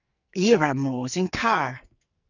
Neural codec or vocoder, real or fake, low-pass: codec, 44.1 kHz, 2.6 kbps, SNAC; fake; 7.2 kHz